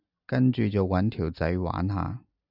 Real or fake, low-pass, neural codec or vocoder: real; 5.4 kHz; none